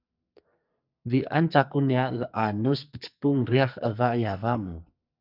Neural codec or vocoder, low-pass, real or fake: codec, 44.1 kHz, 2.6 kbps, SNAC; 5.4 kHz; fake